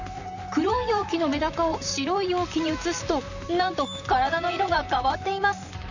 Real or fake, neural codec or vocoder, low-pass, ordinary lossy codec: fake; vocoder, 44.1 kHz, 128 mel bands, Pupu-Vocoder; 7.2 kHz; none